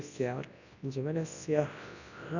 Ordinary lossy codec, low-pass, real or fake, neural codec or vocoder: none; 7.2 kHz; fake; codec, 24 kHz, 0.9 kbps, WavTokenizer, large speech release